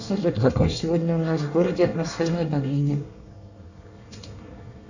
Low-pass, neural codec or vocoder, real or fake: 7.2 kHz; codec, 24 kHz, 1 kbps, SNAC; fake